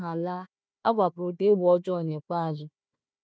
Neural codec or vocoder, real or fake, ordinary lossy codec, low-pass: codec, 16 kHz, 1 kbps, FunCodec, trained on Chinese and English, 50 frames a second; fake; none; none